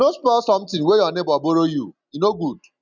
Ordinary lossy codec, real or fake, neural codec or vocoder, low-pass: none; real; none; 7.2 kHz